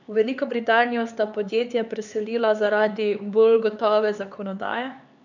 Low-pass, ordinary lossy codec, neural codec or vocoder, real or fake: 7.2 kHz; none; codec, 16 kHz, 4 kbps, X-Codec, HuBERT features, trained on LibriSpeech; fake